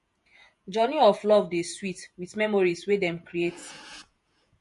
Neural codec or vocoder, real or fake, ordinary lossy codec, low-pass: vocoder, 48 kHz, 128 mel bands, Vocos; fake; MP3, 48 kbps; 14.4 kHz